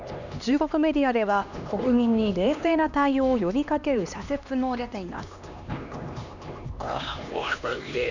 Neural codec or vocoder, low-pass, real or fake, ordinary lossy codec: codec, 16 kHz, 2 kbps, X-Codec, HuBERT features, trained on LibriSpeech; 7.2 kHz; fake; none